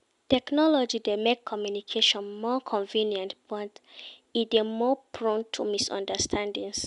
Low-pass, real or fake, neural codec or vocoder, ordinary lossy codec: 10.8 kHz; real; none; none